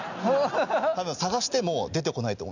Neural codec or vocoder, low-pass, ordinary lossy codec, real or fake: none; 7.2 kHz; none; real